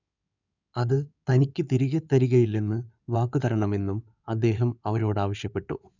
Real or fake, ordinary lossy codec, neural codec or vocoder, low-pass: fake; none; codec, 16 kHz in and 24 kHz out, 2.2 kbps, FireRedTTS-2 codec; 7.2 kHz